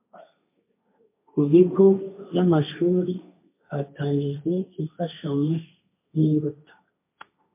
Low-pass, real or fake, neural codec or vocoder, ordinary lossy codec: 3.6 kHz; fake; codec, 16 kHz, 1.1 kbps, Voila-Tokenizer; MP3, 24 kbps